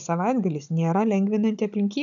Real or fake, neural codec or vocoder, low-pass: fake; codec, 16 kHz, 16 kbps, FunCodec, trained on Chinese and English, 50 frames a second; 7.2 kHz